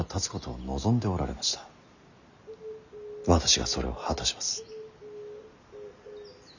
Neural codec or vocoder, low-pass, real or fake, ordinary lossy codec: none; 7.2 kHz; real; none